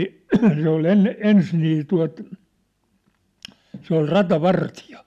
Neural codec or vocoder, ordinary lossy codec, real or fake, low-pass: none; none; real; 14.4 kHz